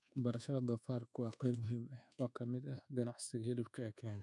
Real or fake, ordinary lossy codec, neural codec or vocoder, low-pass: fake; none; codec, 24 kHz, 1.2 kbps, DualCodec; 10.8 kHz